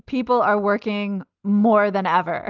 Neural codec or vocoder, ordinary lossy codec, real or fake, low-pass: none; Opus, 24 kbps; real; 7.2 kHz